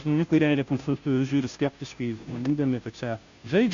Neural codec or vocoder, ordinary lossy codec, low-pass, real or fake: codec, 16 kHz, 0.5 kbps, FunCodec, trained on Chinese and English, 25 frames a second; AAC, 64 kbps; 7.2 kHz; fake